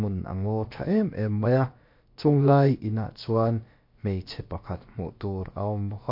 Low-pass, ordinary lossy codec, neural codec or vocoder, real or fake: 5.4 kHz; MP3, 32 kbps; codec, 16 kHz, about 1 kbps, DyCAST, with the encoder's durations; fake